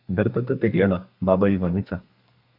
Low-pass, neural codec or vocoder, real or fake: 5.4 kHz; codec, 32 kHz, 1.9 kbps, SNAC; fake